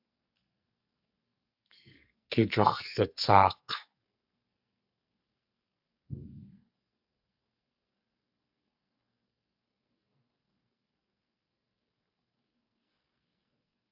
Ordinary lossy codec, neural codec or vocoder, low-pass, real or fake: AAC, 48 kbps; codec, 44.1 kHz, 2.6 kbps, SNAC; 5.4 kHz; fake